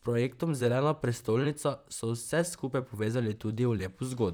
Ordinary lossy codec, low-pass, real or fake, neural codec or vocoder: none; none; fake; vocoder, 44.1 kHz, 128 mel bands, Pupu-Vocoder